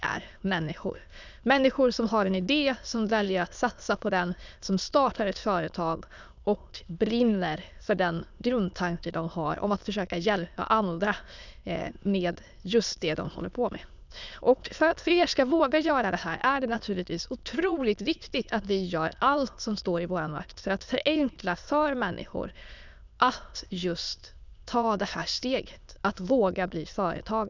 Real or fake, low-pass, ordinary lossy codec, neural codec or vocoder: fake; 7.2 kHz; none; autoencoder, 22.05 kHz, a latent of 192 numbers a frame, VITS, trained on many speakers